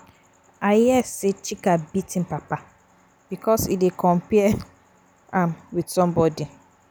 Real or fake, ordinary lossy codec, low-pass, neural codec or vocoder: real; none; none; none